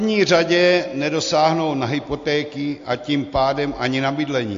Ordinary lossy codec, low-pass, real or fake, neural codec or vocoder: AAC, 48 kbps; 7.2 kHz; real; none